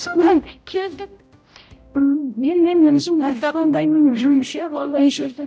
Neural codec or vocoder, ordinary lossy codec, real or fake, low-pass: codec, 16 kHz, 0.5 kbps, X-Codec, HuBERT features, trained on general audio; none; fake; none